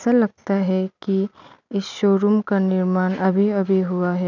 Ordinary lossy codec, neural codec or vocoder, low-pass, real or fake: none; none; 7.2 kHz; real